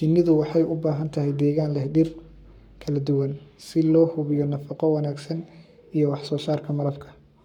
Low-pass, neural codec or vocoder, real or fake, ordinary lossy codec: 19.8 kHz; codec, 44.1 kHz, 7.8 kbps, Pupu-Codec; fake; none